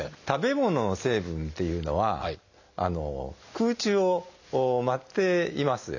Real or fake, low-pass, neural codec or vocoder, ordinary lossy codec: real; 7.2 kHz; none; none